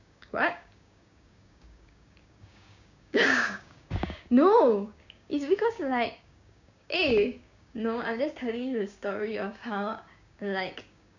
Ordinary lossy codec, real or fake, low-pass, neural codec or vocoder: none; fake; 7.2 kHz; codec, 16 kHz, 6 kbps, DAC